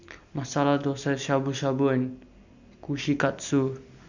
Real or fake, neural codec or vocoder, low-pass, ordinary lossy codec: real; none; 7.2 kHz; none